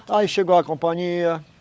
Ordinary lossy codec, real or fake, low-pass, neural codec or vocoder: none; fake; none; codec, 16 kHz, 16 kbps, FunCodec, trained on LibriTTS, 50 frames a second